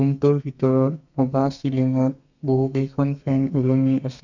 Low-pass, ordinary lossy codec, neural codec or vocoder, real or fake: 7.2 kHz; none; codec, 32 kHz, 1.9 kbps, SNAC; fake